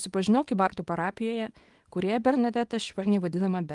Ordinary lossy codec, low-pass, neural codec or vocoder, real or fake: Opus, 24 kbps; 10.8 kHz; codec, 24 kHz, 0.9 kbps, WavTokenizer, small release; fake